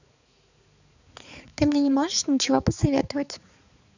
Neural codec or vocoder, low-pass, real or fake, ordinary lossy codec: codec, 16 kHz, 4 kbps, X-Codec, HuBERT features, trained on balanced general audio; 7.2 kHz; fake; none